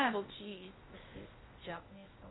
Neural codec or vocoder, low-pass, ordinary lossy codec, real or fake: codec, 16 kHz, 0.8 kbps, ZipCodec; 7.2 kHz; AAC, 16 kbps; fake